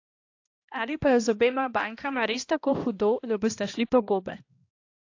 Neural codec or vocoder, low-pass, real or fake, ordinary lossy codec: codec, 16 kHz, 1 kbps, X-Codec, HuBERT features, trained on balanced general audio; 7.2 kHz; fake; AAC, 48 kbps